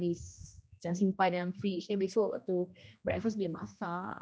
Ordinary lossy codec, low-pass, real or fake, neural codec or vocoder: none; none; fake; codec, 16 kHz, 1 kbps, X-Codec, HuBERT features, trained on general audio